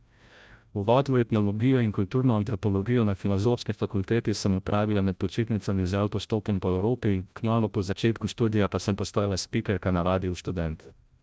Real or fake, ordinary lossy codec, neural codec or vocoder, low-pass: fake; none; codec, 16 kHz, 0.5 kbps, FreqCodec, larger model; none